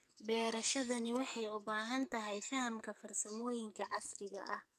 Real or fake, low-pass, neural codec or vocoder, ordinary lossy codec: fake; 14.4 kHz; codec, 44.1 kHz, 2.6 kbps, SNAC; none